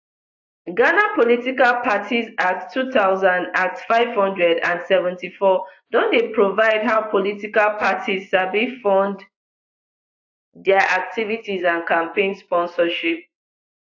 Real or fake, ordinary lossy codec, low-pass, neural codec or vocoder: fake; AAC, 48 kbps; 7.2 kHz; vocoder, 24 kHz, 100 mel bands, Vocos